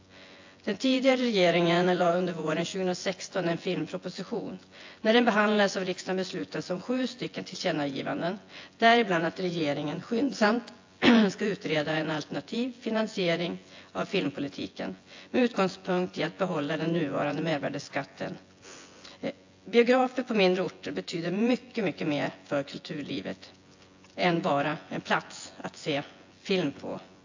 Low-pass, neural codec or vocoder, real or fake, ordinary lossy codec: 7.2 kHz; vocoder, 24 kHz, 100 mel bands, Vocos; fake; AAC, 48 kbps